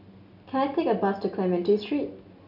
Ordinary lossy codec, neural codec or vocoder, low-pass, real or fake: none; none; 5.4 kHz; real